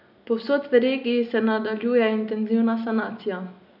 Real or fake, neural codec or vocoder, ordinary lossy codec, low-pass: real; none; none; 5.4 kHz